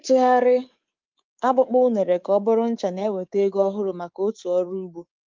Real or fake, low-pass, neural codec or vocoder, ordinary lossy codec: fake; 7.2 kHz; codec, 24 kHz, 3.1 kbps, DualCodec; Opus, 24 kbps